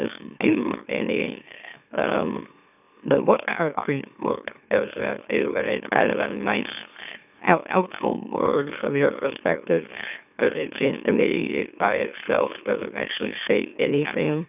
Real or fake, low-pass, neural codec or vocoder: fake; 3.6 kHz; autoencoder, 44.1 kHz, a latent of 192 numbers a frame, MeloTTS